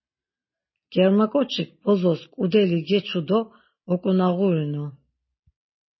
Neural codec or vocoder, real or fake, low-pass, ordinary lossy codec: none; real; 7.2 kHz; MP3, 24 kbps